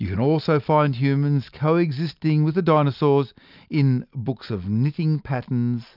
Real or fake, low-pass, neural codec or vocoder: real; 5.4 kHz; none